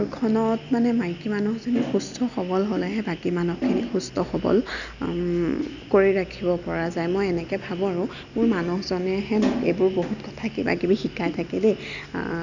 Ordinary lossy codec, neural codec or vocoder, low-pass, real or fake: none; none; 7.2 kHz; real